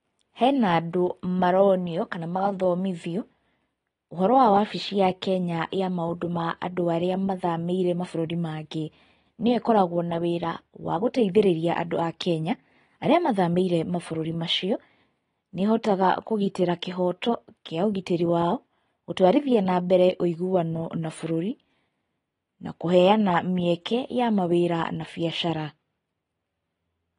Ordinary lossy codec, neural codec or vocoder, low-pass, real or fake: AAC, 32 kbps; none; 10.8 kHz; real